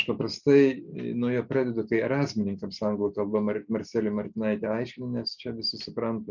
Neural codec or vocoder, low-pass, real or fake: none; 7.2 kHz; real